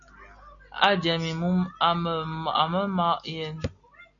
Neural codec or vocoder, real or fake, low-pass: none; real; 7.2 kHz